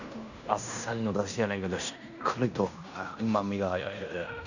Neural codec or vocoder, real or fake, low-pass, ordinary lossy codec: codec, 16 kHz in and 24 kHz out, 0.9 kbps, LongCat-Audio-Codec, fine tuned four codebook decoder; fake; 7.2 kHz; none